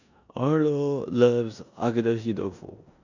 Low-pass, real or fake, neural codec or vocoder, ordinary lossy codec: 7.2 kHz; fake; codec, 16 kHz in and 24 kHz out, 0.9 kbps, LongCat-Audio-Codec, four codebook decoder; none